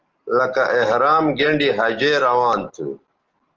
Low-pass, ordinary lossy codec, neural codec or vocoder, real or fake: 7.2 kHz; Opus, 16 kbps; none; real